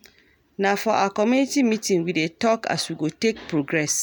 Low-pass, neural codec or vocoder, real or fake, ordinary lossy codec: none; none; real; none